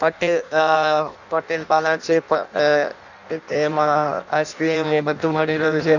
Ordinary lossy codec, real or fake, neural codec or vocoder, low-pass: none; fake; codec, 16 kHz in and 24 kHz out, 0.6 kbps, FireRedTTS-2 codec; 7.2 kHz